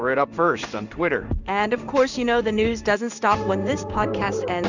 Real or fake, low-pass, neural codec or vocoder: fake; 7.2 kHz; codec, 16 kHz in and 24 kHz out, 1 kbps, XY-Tokenizer